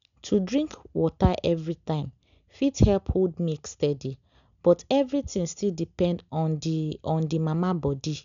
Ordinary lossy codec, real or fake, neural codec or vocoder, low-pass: none; real; none; 7.2 kHz